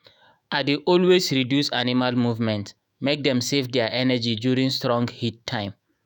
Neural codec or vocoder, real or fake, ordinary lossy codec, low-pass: autoencoder, 48 kHz, 128 numbers a frame, DAC-VAE, trained on Japanese speech; fake; none; none